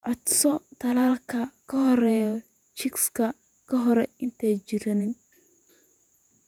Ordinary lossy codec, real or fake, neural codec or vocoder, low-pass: none; fake; vocoder, 48 kHz, 128 mel bands, Vocos; 19.8 kHz